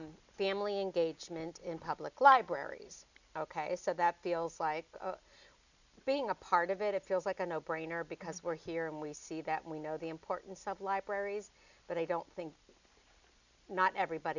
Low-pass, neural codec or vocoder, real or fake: 7.2 kHz; none; real